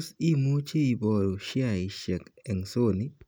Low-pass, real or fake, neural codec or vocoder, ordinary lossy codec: none; real; none; none